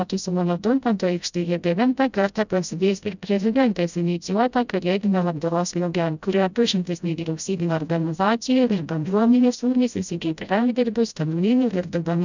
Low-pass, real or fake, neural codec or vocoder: 7.2 kHz; fake; codec, 16 kHz, 0.5 kbps, FreqCodec, smaller model